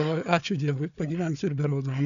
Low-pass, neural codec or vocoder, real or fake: 7.2 kHz; codec, 16 kHz, 4 kbps, FunCodec, trained on Chinese and English, 50 frames a second; fake